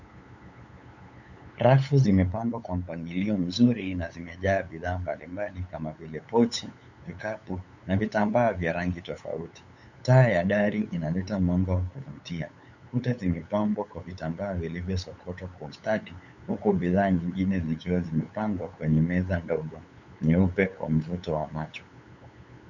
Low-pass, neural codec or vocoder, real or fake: 7.2 kHz; codec, 16 kHz, 8 kbps, FunCodec, trained on LibriTTS, 25 frames a second; fake